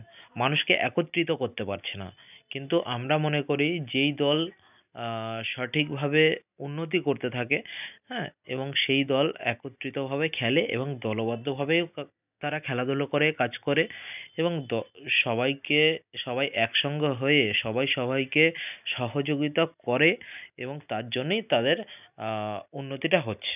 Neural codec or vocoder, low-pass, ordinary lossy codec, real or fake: none; 3.6 kHz; none; real